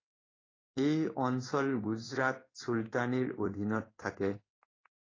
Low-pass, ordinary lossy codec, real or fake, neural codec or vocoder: 7.2 kHz; AAC, 32 kbps; fake; codec, 16 kHz in and 24 kHz out, 1 kbps, XY-Tokenizer